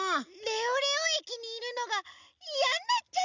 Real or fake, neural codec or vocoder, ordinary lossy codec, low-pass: real; none; none; 7.2 kHz